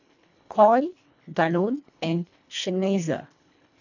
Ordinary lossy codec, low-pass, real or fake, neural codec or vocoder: none; 7.2 kHz; fake; codec, 24 kHz, 1.5 kbps, HILCodec